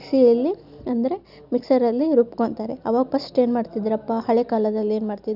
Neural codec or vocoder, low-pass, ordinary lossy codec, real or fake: none; 5.4 kHz; none; real